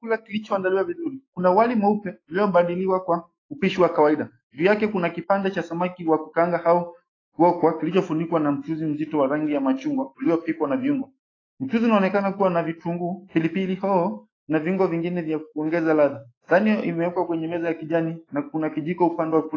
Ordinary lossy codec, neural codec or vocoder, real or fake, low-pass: AAC, 32 kbps; autoencoder, 48 kHz, 128 numbers a frame, DAC-VAE, trained on Japanese speech; fake; 7.2 kHz